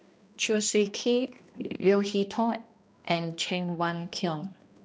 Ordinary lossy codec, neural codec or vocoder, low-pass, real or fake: none; codec, 16 kHz, 1 kbps, X-Codec, HuBERT features, trained on balanced general audio; none; fake